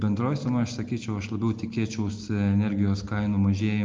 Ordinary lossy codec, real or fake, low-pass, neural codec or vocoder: Opus, 32 kbps; real; 7.2 kHz; none